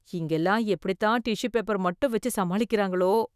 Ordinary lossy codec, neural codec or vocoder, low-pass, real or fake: none; autoencoder, 48 kHz, 128 numbers a frame, DAC-VAE, trained on Japanese speech; 14.4 kHz; fake